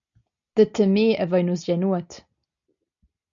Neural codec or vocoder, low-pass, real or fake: none; 7.2 kHz; real